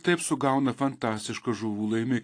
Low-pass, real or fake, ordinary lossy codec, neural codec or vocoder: 9.9 kHz; real; AAC, 48 kbps; none